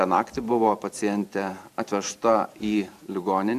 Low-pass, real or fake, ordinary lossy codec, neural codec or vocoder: 14.4 kHz; fake; MP3, 96 kbps; vocoder, 44.1 kHz, 128 mel bands every 512 samples, BigVGAN v2